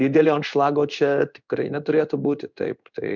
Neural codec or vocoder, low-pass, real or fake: codec, 16 kHz in and 24 kHz out, 1 kbps, XY-Tokenizer; 7.2 kHz; fake